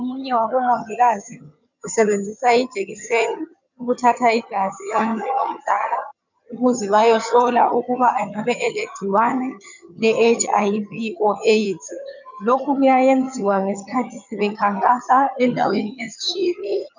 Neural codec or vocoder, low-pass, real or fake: vocoder, 22.05 kHz, 80 mel bands, HiFi-GAN; 7.2 kHz; fake